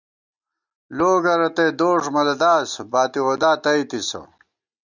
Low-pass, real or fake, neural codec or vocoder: 7.2 kHz; real; none